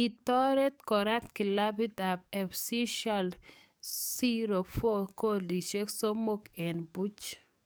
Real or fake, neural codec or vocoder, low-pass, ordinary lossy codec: fake; codec, 44.1 kHz, 7.8 kbps, DAC; none; none